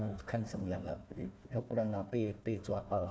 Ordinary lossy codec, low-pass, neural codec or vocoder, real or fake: none; none; codec, 16 kHz, 1 kbps, FunCodec, trained on Chinese and English, 50 frames a second; fake